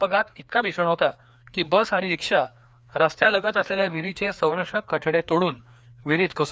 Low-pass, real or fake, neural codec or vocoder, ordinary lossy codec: none; fake; codec, 16 kHz, 2 kbps, FreqCodec, larger model; none